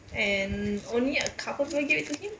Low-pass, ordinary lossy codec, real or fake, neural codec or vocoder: none; none; real; none